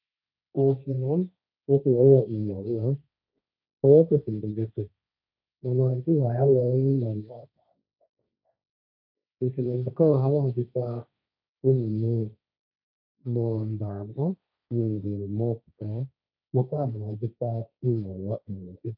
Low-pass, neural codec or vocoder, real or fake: 5.4 kHz; codec, 16 kHz, 1.1 kbps, Voila-Tokenizer; fake